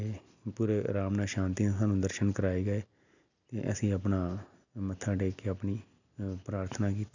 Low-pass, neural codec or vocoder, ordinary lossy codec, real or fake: 7.2 kHz; none; none; real